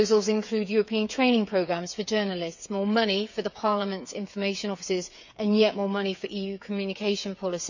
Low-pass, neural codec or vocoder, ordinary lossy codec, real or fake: 7.2 kHz; codec, 16 kHz, 8 kbps, FreqCodec, smaller model; none; fake